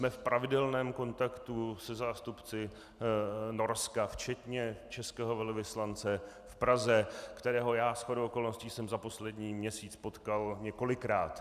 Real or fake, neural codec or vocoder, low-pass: real; none; 14.4 kHz